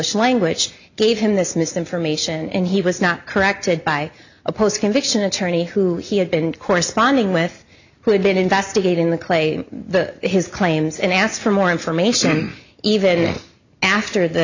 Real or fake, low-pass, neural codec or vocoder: real; 7.2 kHz; none